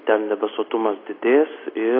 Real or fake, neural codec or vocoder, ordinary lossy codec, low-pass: real; none; AAC, 24 kbps; 5.4 kHz